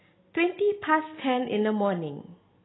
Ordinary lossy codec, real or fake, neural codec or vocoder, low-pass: AAC, 16 kbps; real; none; 7.2 kHz